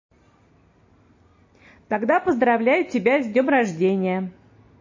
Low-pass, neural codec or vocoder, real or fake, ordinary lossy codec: 7.2 kHz; none; real; MP3, 32 kbps